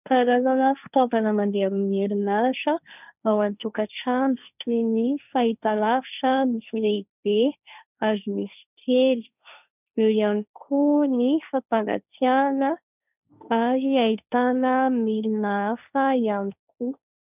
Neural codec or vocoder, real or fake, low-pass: codec, 16 kHz, 1.1 kbps, Voila-Tokenizer; fake; 3.6 kHz